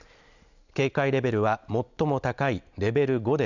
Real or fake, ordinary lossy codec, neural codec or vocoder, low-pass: real; none; none; 7.2 kHz